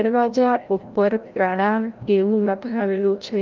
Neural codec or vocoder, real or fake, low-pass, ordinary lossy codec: codec, 16 kHz, 0.5 kbps, FreqCodec, larger model; fake; 7.2 kHz; Opus, 32 kbps